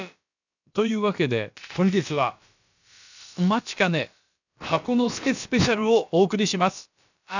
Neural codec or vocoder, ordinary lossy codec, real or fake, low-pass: codec, 16 kHz, about 1 kbps, DyCAST, with the encoder's durations; none; fake; 7.2 kHz